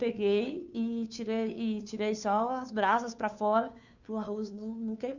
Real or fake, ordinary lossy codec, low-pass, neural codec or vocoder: fake; none; 7.2 kHz; codec, 16 kHz, 2 kbps, FunCodec, trained on Chinese and English, 25 frames a second